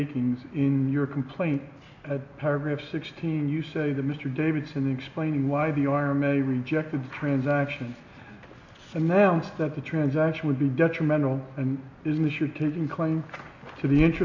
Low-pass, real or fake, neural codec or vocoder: 7.2 kHz; real; none